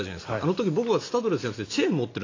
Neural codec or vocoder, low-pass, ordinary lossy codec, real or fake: none; 7.2 kHz; AAC, 32 kbps; real